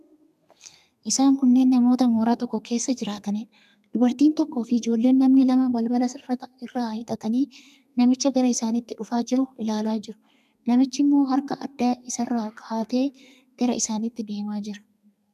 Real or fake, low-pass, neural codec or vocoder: fake; 14.4 kHz; codec, 32 kHz, 1.9 kbps, SNAC